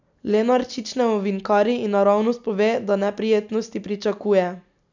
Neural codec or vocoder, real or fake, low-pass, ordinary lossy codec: none; real; 7.2 kHz; none